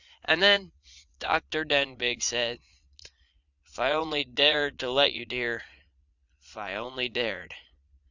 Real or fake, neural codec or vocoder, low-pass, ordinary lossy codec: fake; vocoder, 22.05 kHz, 80 mel bands, Vocos; 7.2 kHz; Opus, 64 kbps